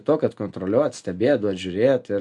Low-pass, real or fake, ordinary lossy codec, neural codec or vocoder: 10.8 kHz; real; MP3, 64 kbps; none